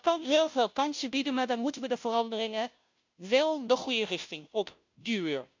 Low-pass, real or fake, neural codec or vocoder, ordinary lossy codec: 7.2 kHz; fake; codec, 16 kHz, 0.5 kbps, FunCodec, trained on Chinese and English, 25 frames a second; MP3, 64 kbps